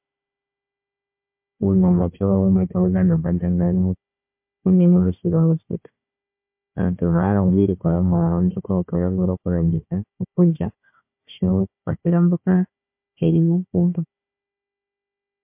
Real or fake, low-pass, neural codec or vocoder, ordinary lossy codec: fake; 3.6 kHz; codec, 16 kHz, 1 kbps, FunCodec, trained on Chinese and English, 50 frames a second; MP3, 32 kbps